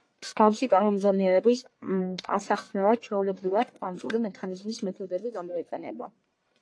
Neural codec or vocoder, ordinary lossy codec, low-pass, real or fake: codec, 44.1 kHz, 1.7 kbps, Pupu-Codec; AAC, 48 kbps; 9.9 kHz; fake